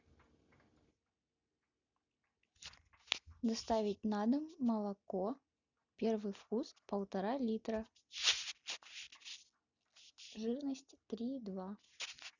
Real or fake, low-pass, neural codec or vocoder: real; 7.2 kHz; none